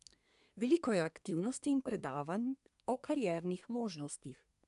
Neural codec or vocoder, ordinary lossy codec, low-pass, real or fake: codec, 24 kHz, 1 kbps, SNAC; none; 10.8 kHz; fake